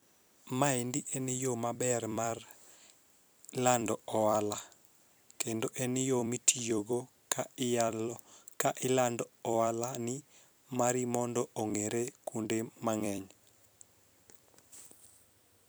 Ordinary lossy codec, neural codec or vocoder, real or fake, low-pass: none; vocoder, 44.1 kHz, 128 mel bands every 256 samples, BigVGAN v2; fake; none